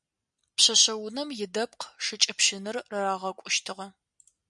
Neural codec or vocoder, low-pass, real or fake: none; 10.8 kHz; real